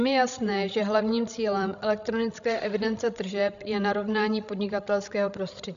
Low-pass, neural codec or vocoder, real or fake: 7.2 kHz; codec, 16 kHz, 16 kbps, FreqCodec, larger model; fake